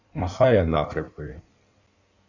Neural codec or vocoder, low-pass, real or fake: codec, 16 kHz in and 24 kHz out, 1.1 kbps, FireRedTTS-2 codec; 7.2 kHz; fake